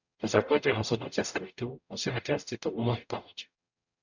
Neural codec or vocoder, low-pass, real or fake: codec, 44.1 kHz, 0.9 kbps, DAC; 7.2 kHz; fake